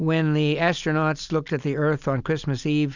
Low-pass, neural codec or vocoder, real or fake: 7.2 kHz; none; real